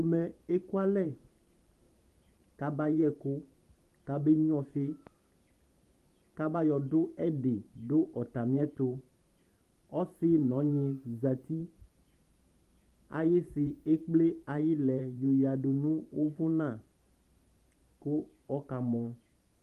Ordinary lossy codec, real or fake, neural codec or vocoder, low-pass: Opus, 24 kbps; fake; vocoder, 44.1 kHz, 128 mel bands every 512 samples, BigVGAN v2; 14.4 kHz